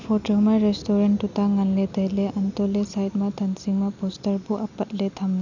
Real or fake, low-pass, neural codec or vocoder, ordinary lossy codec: real; 7.2 kHz; none; none